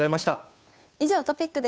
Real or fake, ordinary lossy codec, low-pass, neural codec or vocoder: fake; none; none; codec, 16 kHz, 2 kbps, FunCodec, trained on Chinese and English, 25 frames a second